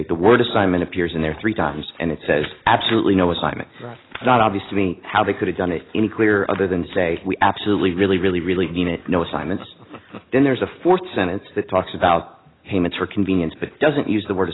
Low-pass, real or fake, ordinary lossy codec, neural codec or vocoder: 7.2 kHz; real; AAC, 16 kbps; none